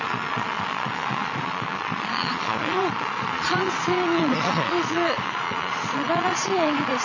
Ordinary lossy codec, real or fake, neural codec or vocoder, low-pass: none; fake; vocoder, 22.05 kHz, 80 mel bands, Vocos; 7.2 kHz